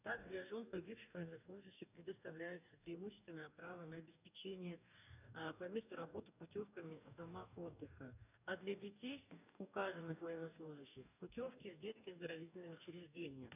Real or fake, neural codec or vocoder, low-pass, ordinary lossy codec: fake; codec, 44.1 kHz, 2.6 kbps, DAC; 3.6 kHz; none